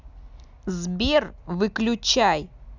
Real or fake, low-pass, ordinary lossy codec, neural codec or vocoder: real; 7.2 kHz; none; none